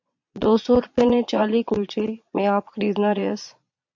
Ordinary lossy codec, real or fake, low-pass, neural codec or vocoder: MP3, 48 kbps; fake; 7.2 kHz; vocoder, 22.05 kHz, 80 mel bands, Vocos